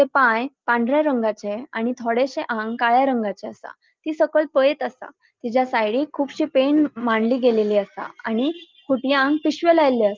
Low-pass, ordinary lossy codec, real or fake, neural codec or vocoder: 7.2 kHz; Opus, 32 kbps; real; none